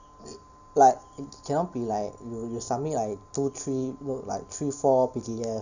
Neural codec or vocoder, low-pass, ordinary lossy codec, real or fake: none; 7.2 kHz; none; real